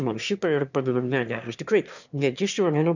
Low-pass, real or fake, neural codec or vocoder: 7.2 kHz; fake; autoencoder, 22.05 kHz, a latent of 192 numbers a frame, VITS, trained on one speaker